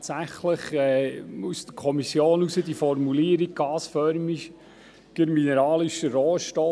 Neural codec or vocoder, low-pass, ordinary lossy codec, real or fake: none; none; none; real